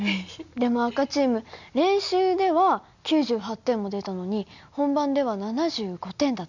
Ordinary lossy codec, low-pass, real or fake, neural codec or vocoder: none; 7.2 kHz; real; none